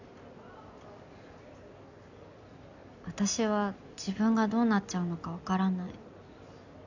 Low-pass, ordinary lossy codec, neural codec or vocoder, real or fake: 7.2 kHz; none; none; real